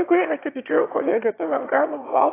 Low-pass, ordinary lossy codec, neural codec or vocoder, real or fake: 3.6 kHz; AAC, 16 kbps; autoencoder, 22.05 kHz, a latent of 192 numbers a frame, VITS, trained on one speaker; fake